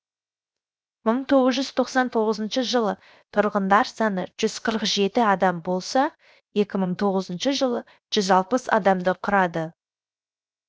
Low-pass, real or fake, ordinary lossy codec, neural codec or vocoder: none; fake; none; codec, 16 kHz, 0.7 kbps, FocalCodec